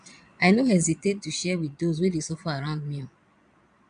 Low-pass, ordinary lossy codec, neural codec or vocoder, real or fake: 9.9 kHz; none; vocoder, 22.05 kHz, 80 mel bands, Vocos; fake